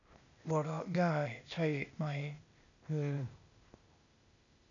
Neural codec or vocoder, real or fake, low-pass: codec, 16 kHz, 0.8 kbps, ZipCodec; fake; 7.2 kHz